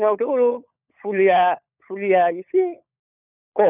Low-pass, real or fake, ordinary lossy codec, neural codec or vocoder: 3.6 kHz; fake; none; codec, 16 kHz, 16 kbps, FunCodec, trained on LibriTTS, 50 frames a second